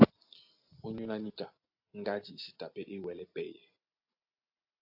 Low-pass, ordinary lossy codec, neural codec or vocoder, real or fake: 5.4 kHz; AAC, 48 kbps; none; real